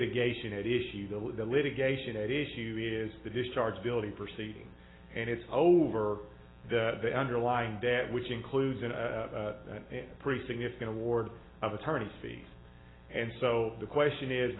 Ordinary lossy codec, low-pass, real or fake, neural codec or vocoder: AAC, 16 kbps; 7.2 kHz; real; none